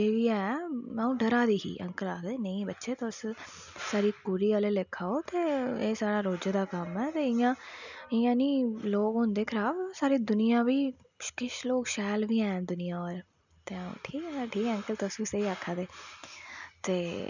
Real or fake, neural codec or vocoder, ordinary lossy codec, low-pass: real; none; none; 7.2 kHz